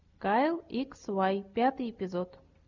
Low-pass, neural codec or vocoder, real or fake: 7.2 kHz; none; real